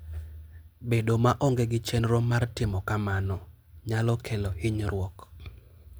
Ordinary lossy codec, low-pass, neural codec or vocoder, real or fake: none; none; none; real